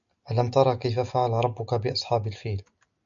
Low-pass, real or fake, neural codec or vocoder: 7.2 kHz; real; none